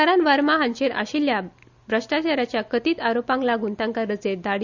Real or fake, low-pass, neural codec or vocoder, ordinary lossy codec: real; 7.2 kHz; none; none